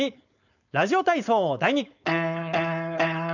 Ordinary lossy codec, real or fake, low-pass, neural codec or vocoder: none; fake; 7.2 kHz; codec, 16 kHz, 4.8 kbps, FACodec